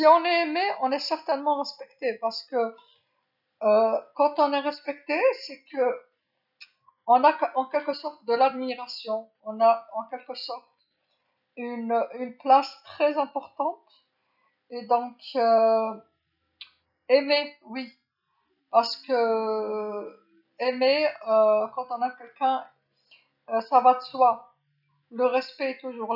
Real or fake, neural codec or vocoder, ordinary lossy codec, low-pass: real; none; none; 5.4 kHz